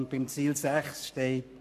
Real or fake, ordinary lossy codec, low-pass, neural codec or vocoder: fake; none; 14.4 kHz; codec, 44.1 kHz, 7.8 kbps, Pupu-Codec